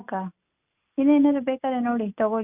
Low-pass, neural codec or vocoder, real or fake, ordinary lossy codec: 3.6 kHz; none; real; none